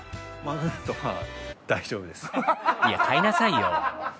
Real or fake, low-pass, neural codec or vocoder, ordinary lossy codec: real; none; none; none